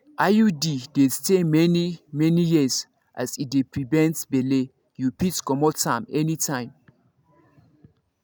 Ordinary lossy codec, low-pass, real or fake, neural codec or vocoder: none; none; real; none